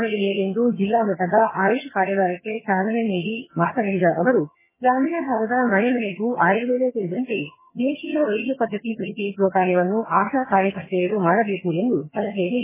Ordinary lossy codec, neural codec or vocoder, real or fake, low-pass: MP3, 16 kbps; vocoder, 22.05 kHz, 80 mel bands, HiFi-GAN; fake; 3.6 kHz